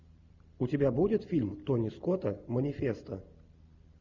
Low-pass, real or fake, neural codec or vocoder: 7.2 kHz; real; none